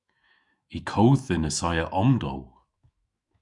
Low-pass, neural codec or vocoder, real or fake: 10.8 kHz; autoencoder, 48 kHz, 128 numbers a frame, DAC-VAE, trained on Japanese speech; fake